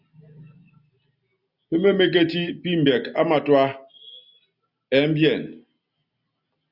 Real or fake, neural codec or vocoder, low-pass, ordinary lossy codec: real; none; 5.4 kHz; Opus, 64 kbps